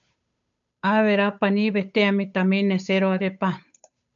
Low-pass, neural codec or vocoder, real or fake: 7.2 kHz; codec, 16 kHz, 8 kbps, FunCodec, trained on Chinese and English, 25 frames a second; fake